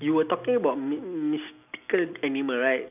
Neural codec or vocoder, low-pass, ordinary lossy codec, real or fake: none; 3.6 kHz; none; real